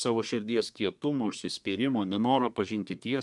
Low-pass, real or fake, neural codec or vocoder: 10.8 kHz; fake; codec, 24 kHz, 1 kbps, SNAC